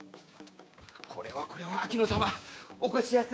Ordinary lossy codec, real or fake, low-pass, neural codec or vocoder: none; fake; none; codec, 16 kHz, 6 kbps, DAC